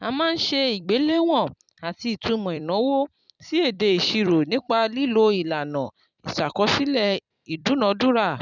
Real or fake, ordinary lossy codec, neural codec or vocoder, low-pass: fake; none; vocoder, 44.1 kHz, 128 mel bands every 256 samples, BigVGAN v2; 7.2 kHz